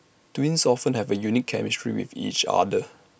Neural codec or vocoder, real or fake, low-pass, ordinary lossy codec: none; real; none; none